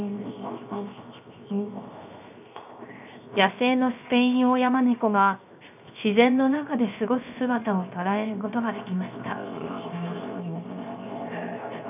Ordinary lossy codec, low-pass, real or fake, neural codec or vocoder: none; 3.6 kHz; fake; codec, 16 kHz, 0.7 kbps, FocalCodec